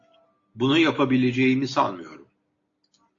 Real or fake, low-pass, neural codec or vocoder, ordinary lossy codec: real; 7.2 kHz; none; AAC, 48 kbps